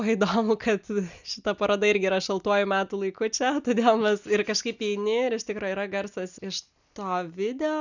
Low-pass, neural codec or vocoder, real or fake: 7.2 kHz; none; real